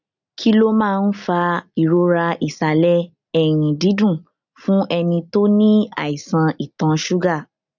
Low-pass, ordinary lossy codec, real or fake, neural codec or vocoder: 7.2 kHz; none; real; none